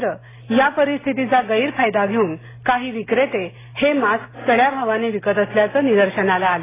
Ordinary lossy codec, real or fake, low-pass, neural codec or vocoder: AAC, 16 kbps; real; 3.6 kHz; none